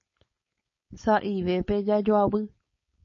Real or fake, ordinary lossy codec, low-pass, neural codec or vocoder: fake; MP3, 32 kbps; 7.2 kHz; codec, 16 kHz, 4.8 kbps, FACodec